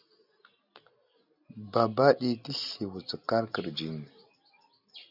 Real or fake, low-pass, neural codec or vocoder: real; 5.4 kHz; none